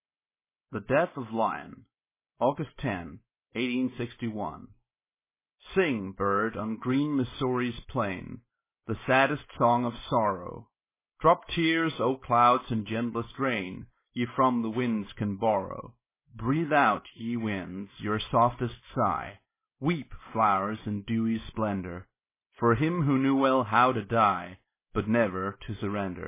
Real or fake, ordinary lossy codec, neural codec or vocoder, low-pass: real; MP3, 16 kbps; none; 3.6 kHz